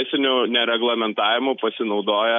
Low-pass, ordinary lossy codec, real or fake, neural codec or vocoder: 7.2 kHz; MP3, 48 kbps; real; none